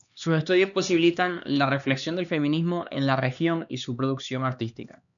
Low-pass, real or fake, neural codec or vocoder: 7.2 kHz; fake; codec, 16 kHz, 2 kbps, X-Codec, HuBERT features, trained on LibriSpeech